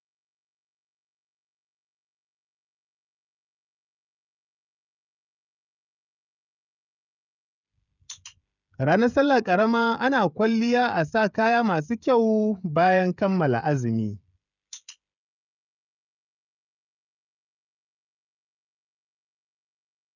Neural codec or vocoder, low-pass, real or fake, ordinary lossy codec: codec, 16 kHz, 16 kbps, FreqCodec, smaller model; 7.2 kHz; fake; none